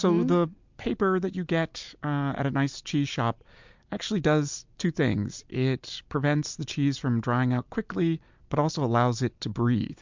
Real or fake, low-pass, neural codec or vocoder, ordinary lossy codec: real; 7.2 kHz; none; MP3, 64 kbps